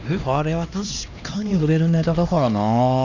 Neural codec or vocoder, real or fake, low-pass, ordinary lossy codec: codec, 16 kHz, 2 kbps, X-Codec, HuBERT features, trained on LibriSpeech; fake; 7.2 kHz; none